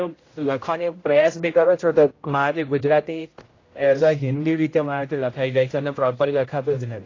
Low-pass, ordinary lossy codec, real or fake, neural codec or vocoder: 7.2 kHz; AAC, 32 kbps; fake; codec, 16 kHz, 0.5 kbps, X-Codec, HuBERT features, trained on general audio